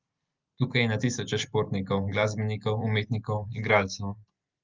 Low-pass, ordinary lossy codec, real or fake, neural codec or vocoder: 7.2 kHz; Opus, 24 kbps; real; none